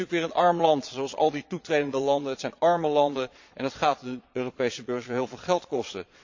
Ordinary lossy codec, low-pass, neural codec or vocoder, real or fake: MP3, 48 kbps; 7.2 kHz; vocoder, 22.05 kHz, 80 mel bands, Vocos; fake